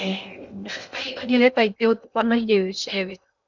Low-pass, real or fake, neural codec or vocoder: 7.2 kHz; fake; codec, 16 kHz in and 24 kHz out, 0.6 kbps, FocalCodec, streaming, 2048 codes